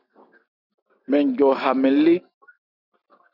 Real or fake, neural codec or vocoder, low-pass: real; none; 5.4 kHz